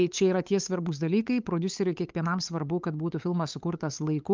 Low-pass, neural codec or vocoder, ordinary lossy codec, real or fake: 7.2 kHz; codec, 16 kHz, 8 kbps, FunCodec, trained on LibriTTS, 25 frames a second; Opus, 24 kbps; fake